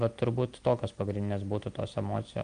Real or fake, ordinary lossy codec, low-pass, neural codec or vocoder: real; Opus, 32 kbps; 9.9 kHz; none